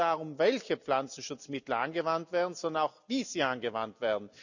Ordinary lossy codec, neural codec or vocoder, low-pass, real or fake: none; none; 7.2 kHz; real